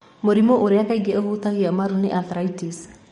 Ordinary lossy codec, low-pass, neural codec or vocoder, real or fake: MP3, 48 kbps; 19.8 kHz; codec, 44.1 kHz, 7.8 kbps, DAC; fake